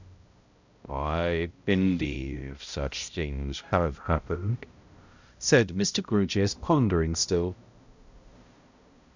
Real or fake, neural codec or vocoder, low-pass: fake; codec, 16 kHz, 0.5 kbps, X-Codec, HuBERT features, trained on balanced general audio; 7.2 kHz